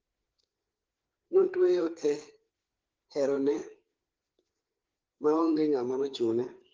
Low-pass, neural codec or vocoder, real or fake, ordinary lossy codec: 7.2 kHz; codec, 16 kHz, 4 kbps, FreqCodec, larger model; fake; Opus, 16 kbps